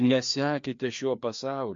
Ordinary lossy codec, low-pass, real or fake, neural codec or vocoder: MP3, 48 kbps; 7.2 kHz; fake; codec, 16 kHz, 2 kbps, FreqCodec, larger model